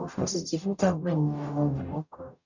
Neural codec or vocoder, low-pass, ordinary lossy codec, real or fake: codec, 44.1 kHz, 0.9 kbps, DAC; 7.2 kHz; none; fake